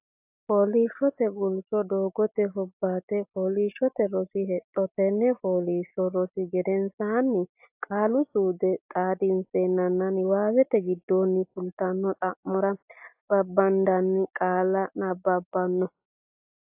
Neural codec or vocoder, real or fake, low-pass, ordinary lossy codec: none; real; 3.6 kHz; AAC, 32 kbps